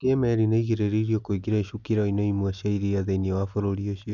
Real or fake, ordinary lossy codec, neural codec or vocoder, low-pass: real; none; none; 7.2 kHz